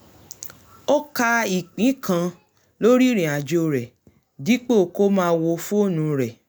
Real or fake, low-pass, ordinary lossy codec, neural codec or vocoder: real; none; none; none